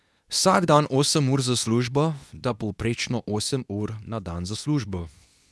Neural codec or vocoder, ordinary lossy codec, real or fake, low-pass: codec, 24 kHz, 0.9 kbps, WavTokenizer, medium speech release version 2; none; fake; none